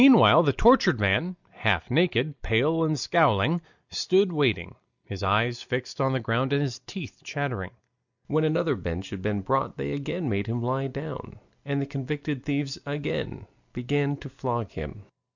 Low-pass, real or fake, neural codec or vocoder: 7.2 kHz; real; none